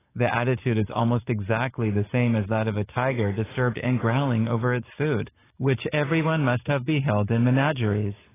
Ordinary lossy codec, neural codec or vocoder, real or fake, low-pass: AAC, 16 kbps; codec, 44.1 kHz, 7.8 kbps, DAC; fake; 3.6 kHz